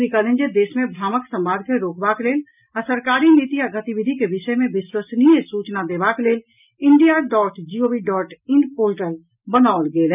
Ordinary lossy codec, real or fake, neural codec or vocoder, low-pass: none; real; none; 3.6 kHz